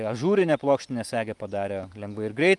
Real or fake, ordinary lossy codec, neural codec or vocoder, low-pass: real; Opus, 32 kbps; none; 10.8 kHz